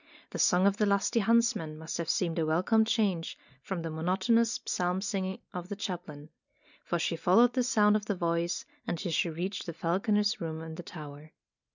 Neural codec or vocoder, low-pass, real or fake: none; 7.2 kHz; real